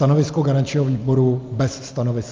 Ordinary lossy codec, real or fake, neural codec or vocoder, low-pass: Opus, 24 kbps; real; none; 7.2 kHz